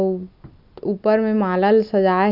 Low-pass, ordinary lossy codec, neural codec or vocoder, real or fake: 5.4 kHz; none; none; real